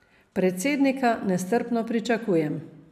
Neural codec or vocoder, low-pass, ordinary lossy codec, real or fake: none; 14.4 kHz; none; real